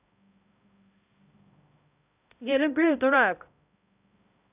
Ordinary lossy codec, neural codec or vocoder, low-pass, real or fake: none; codec, 16 kHz, 0.5 kbps, X-Codec, HuBERT features, trained on balanced general audio; 3.6 kHz; fake